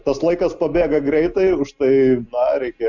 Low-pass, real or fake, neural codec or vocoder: 7.2 kHz; real; none